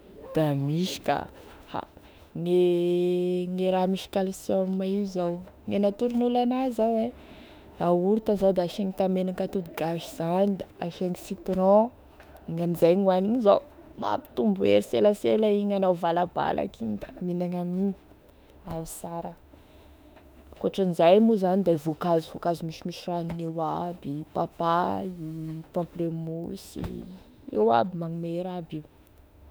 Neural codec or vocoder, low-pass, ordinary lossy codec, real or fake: autoencoder, 48 kHz, 32 numbers a frame, DAC-VAE, trained on Japanese speech; none; none; fake